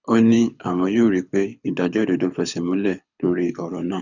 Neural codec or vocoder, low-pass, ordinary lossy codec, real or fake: codec, 24 kHz, 6 kbps, HILCodec; 7.2 kHz; none; fake